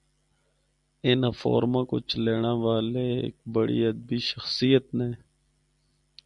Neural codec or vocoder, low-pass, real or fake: none; 10.8 kHz; real